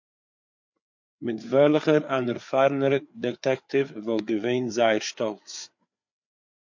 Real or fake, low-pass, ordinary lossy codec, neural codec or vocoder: fake; 7.2 kHz; MP3, 48 kbps; codec, 16 kHz, 4 kbps, FreqCodec, larger model